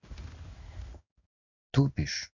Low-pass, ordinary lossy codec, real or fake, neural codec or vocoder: 7.2 kHz; none; fake; codec, 16 kHz in and 24 kHz out, 1 kbps, XY-Tokenizer